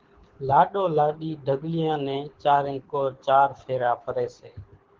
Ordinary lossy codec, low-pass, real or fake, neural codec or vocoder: Opus, 16 kbps; 7.2 kHz; fake; codec, 24 kHz, 6 kbps, HILCodec